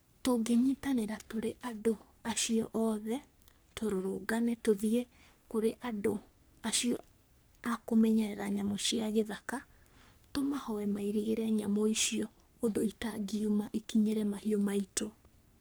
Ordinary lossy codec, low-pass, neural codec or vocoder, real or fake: none; none; codec, 44.1 kHz, 3.4 kbps, Pupu-Codec; fake